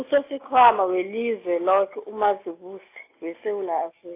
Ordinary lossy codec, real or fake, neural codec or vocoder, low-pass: AAC, 24 kbps; real; none; 3.6 kHz